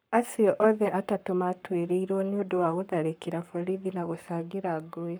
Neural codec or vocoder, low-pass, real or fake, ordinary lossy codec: codec, 44.1 kHz, 7.8 kbps, Pupu-Codec; none; fake; none